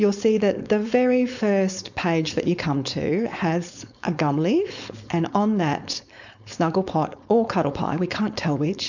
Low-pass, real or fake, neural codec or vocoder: 7.2 kHz; fake; codec, 16 kHz, 4.8 kbps, FACodec